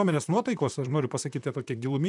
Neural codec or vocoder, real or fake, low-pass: vocoder, 44.1 kHz, 128 mel bands, Pupu-Vocoder; fake; 10.8 kHz